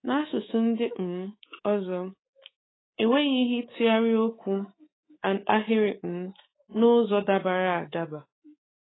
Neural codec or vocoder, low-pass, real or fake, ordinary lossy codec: codec, 24 kHz, 3.1 kbps, DualCodec; 7.2 kHz; fake; AAC, 16 kbps